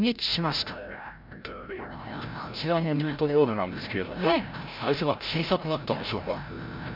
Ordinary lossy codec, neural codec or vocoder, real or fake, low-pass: MP3, 48 kbps; codec, 16 kHz, 0.5 kbps, FreqCodec, larger model; fake; 5.4 kHz